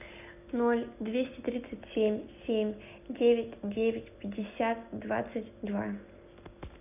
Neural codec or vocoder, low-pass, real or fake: none; 3.6 kHz; real